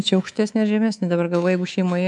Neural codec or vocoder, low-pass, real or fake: codec, 24 kHz, 3.1 kbps, DualCodec; 10.8 kHz; fake